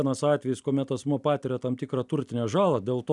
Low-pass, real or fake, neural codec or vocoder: 10.8 kHz; fake; vocoder, 44.1 kHz, 128 mel bands every 256 samples, BigVGAN v2